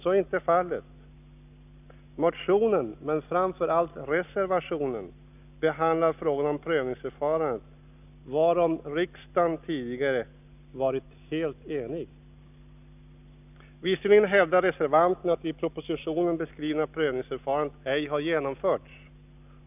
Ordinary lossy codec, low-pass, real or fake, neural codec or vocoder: none; 3.6 kHz; real; none